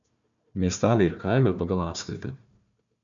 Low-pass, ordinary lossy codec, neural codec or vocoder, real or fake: 7.2 kHz; MP3, 64 kbps; codec, 16 kHz, 1 kbps, FunCodec, trained on Chinese and English, 50 frames a second; fake